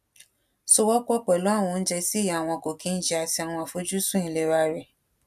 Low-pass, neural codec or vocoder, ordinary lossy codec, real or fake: 14.4 kHz; none; none; real